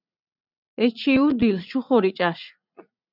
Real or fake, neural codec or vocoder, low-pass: fake; vocoder, 44.1 kHz, 80 mel bands, Vocos; 5.4 kHz